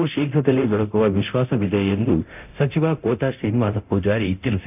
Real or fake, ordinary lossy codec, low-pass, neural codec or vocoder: fake; none; 3.6 kHz; codec, 24 kHz, 0.9 kbps, DualCodec